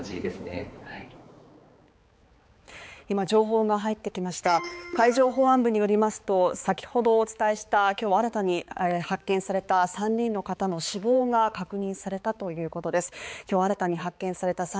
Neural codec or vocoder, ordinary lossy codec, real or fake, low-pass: codec, 16 kHz, 4 kbps, X-Codec, HuBERT features, trained on balanced general audio; none; fake; none